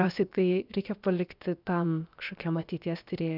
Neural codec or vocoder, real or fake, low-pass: codec, 16 kHz, about 1 kbps, DyCAST, with the encoder's durations; fake; 5.4 kHz